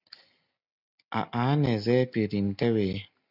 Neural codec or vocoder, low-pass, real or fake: none; 5.4 kHz; real